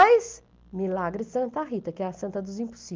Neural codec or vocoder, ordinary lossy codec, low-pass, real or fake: none; Opus, 32 kbps; 7.2 kHz; real